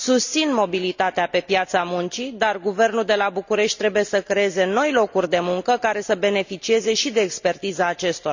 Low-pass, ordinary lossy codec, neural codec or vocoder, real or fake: 7.2 kHz; none; none; real